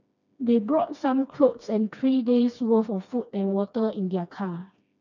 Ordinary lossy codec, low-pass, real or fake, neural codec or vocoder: none; 7.2 kHz; fake; codec, 16 kHz, 2 kbps, FreqCodec, smaller model